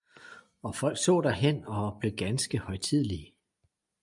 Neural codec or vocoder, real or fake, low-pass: none; real; 10.8 kHz